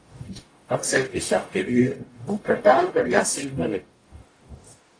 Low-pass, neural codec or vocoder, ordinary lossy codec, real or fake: 9.9 kHz; codec, 44.1 kHz, 0.9 kbps, DAC; AAC, 32 kbps; fake